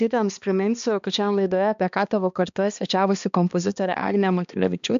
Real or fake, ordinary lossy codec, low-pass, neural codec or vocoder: fake; MP3, 64 kbps; 7.2 kHz; codec, 16 kHz, 2 kbps, X-Codec, HuBERT features, trained on balanced general audio